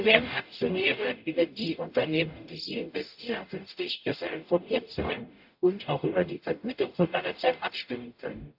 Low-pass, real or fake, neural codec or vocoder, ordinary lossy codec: 5.4 kHz; fake; codec, 44.1 kHz, 0.9 kbps, DAC; AAC, 48 kbps